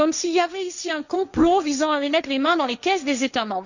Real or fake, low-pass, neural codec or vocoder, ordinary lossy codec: fake; 7.2 kHz; codec, 16 kHz, 1.1 kbps, Voila-Tokenizer; none